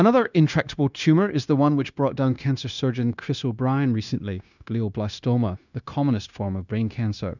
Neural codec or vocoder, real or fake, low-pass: codec, 16 kHz, 0.9 kbps, LongCat-Audio-Codec; fake; 7.2 kHz